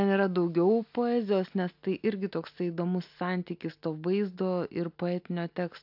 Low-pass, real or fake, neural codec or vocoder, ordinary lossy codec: 5.4 kHz; real; none; AAC, 48 kbps